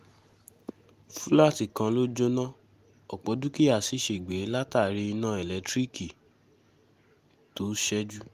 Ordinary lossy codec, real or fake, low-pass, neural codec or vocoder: Opus, 32 kbps; real; 14.4 kHz; none